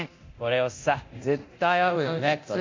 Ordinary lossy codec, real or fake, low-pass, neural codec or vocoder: MP3, 64 kbps; fake; 7.2 kHz; codec, 24 kHz, 0.9 kbps, DualCodec